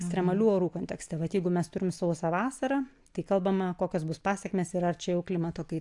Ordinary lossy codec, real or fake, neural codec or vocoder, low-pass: AAC, 64 kbps; real; none; 10.8 kHz